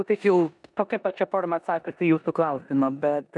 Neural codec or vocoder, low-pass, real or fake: codec, 16 kHz in and 24 kHz out, 0.9 kbps, LongCat-Audio-Codec, four codebook decoder; 10.8 kHz; fake